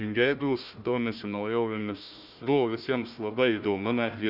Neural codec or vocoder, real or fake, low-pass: codec, 16 kHz, 1 kbps, FunCodec, trained on Chinese and English, 50 frames a second; fake; 5.4 kHz